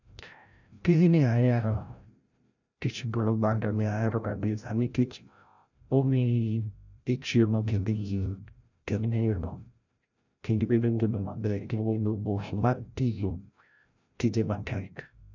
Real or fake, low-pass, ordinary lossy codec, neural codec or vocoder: fake; 7.2 kHz; none; codec, 16 kHz, 0.5 kbps, FreqCodec, larger model